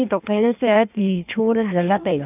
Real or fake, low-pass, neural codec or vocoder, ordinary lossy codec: fake; 3.6 kHz; codec, 16 kHz in and 24 kHz out, 1.1 kbps, FireRedTTS-2 codec; none